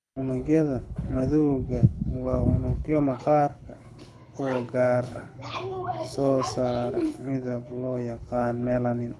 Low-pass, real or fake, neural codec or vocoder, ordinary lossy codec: none; fake; codec, 24 kHz, 6 kbps, HILCodec; none